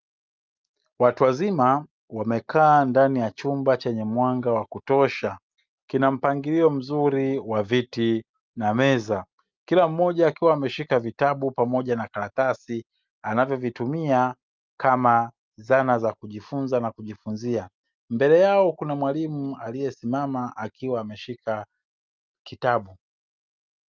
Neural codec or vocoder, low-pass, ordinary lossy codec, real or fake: none; 7.2 kHz; Opus, 24 kbps; real